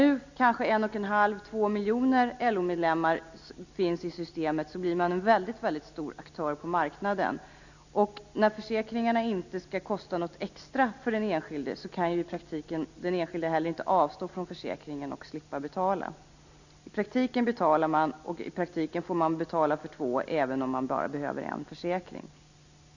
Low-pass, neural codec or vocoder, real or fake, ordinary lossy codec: 7.2 kHz; none; real; none